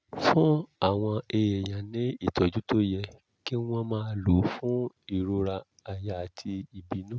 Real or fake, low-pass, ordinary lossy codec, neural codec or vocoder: real; none; none; none